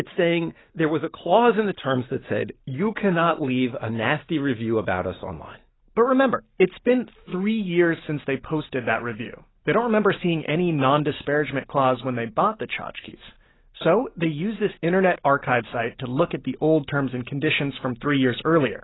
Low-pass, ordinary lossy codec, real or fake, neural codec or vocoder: 7.2 kHz; AAC, 16 kbps; real; none